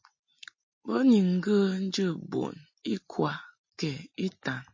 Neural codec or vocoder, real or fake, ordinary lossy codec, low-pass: none; real; MP3, 32 kbps; 7.2 kHz